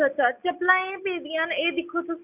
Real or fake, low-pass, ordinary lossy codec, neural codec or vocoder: real; 3.6 kHz; none; none